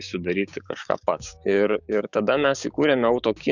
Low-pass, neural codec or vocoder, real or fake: 7.2 kHz; codec, 16 kHz, 6 kbps, DAC; fake